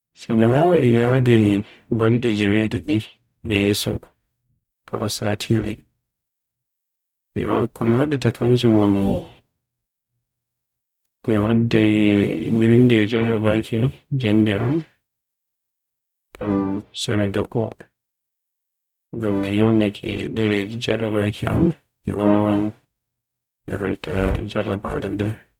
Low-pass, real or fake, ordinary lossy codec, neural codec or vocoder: 19.8 kHz; fake; none; codec, 44.1 kHz, 0.9 kbps, DAC